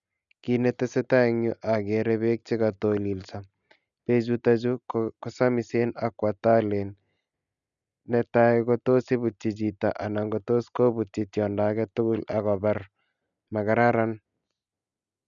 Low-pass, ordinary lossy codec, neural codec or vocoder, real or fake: 7.2 kHz; none; none; real